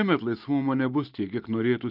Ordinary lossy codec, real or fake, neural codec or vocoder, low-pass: Opus, 32 kbps; real; none; 5.4 kHz